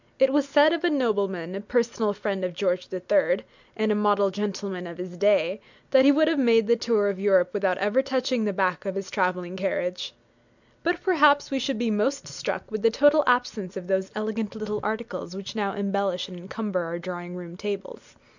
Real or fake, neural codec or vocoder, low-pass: real; none; 7.2 kHz